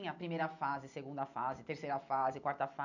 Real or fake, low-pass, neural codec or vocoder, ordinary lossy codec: real; 7.2 kHz; none; none